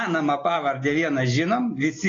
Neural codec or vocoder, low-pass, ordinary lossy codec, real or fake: none; 7.2 kHz; AAC, 32 kbps; real